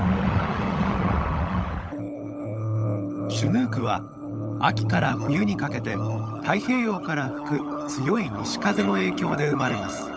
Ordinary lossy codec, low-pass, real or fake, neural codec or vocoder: none; none; fake; codec, 16 kHz, 16 kbps, FunCodec, trained on LibriTTS, 50 frames a second